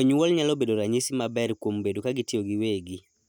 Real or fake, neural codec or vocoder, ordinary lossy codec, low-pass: real; none; none; 19.8 kHz